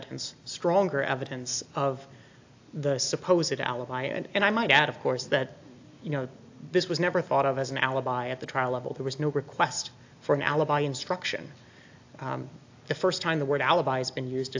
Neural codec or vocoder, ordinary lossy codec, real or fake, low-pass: none; AAC, 48 kbps; real; 7.2 kHz